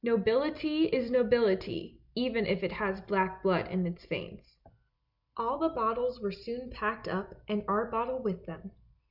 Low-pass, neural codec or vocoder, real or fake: 5.4 kHz; none; real